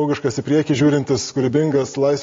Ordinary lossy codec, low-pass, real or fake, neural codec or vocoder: AAC, 32 kbps; 7.2 kHz; real; none